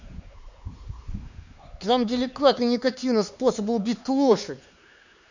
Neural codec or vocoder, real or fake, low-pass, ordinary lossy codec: codec, 16 kHz, 4 kbps, X-Codec, HuBERT features, trained on LibriSpeech; fake; 7.2 kHz; none